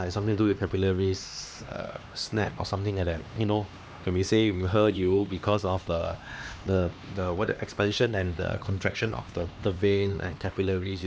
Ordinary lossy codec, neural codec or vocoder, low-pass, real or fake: none; codec, 16 kHz, 2 kbps, X-Codec, HuBERT features, trained on LibriSpeech; none; fake